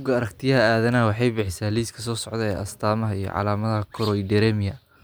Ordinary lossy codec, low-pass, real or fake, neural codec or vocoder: none; none; real; none